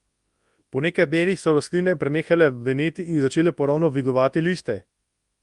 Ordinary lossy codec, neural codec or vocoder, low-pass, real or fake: Opus, 32 kbps; codec, 24 kHz, 0.9 kbps, WavTokenizer, large speech release; 10.8 kHz; fake